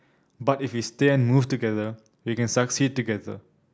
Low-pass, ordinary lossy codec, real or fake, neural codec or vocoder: none; none; real; none